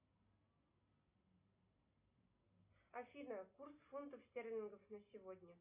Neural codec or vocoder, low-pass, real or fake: none; 3.6 kHz; real